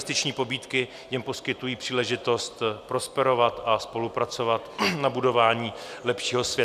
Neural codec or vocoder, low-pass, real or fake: none; 14.4 kHz; real